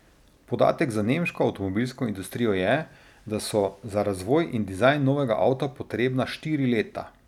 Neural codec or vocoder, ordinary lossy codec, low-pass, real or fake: none; none; 19.8 kHz; real